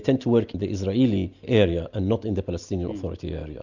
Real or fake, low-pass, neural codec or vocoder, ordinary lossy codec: real; 7.2 kHz; none; Opus, 64 kbps